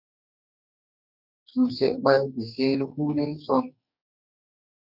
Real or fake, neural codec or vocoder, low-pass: fake; codec, 44.1 kHz, 2.6 kbps, DAC; 5.4 kHz